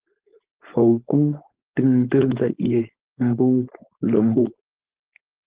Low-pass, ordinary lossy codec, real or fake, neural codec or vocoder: 3.6 kHz; Opus, 16 kbps; fake; codec, 16 kHz, 4.8 kbps, FACodec